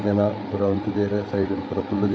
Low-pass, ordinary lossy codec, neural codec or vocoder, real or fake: none; none; codec, 16 kHz, 8 kbps, FreqCodec, larger model; fake